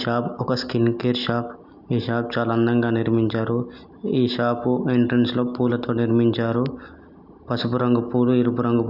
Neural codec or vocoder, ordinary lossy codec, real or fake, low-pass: none; none; real; 5.4 kHz